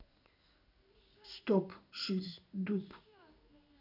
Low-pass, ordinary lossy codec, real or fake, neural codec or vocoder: 5.4 kHz; none; fake; codec, 16 kHz in and 24 kHz out, 1 kbps, XY-Tokenizer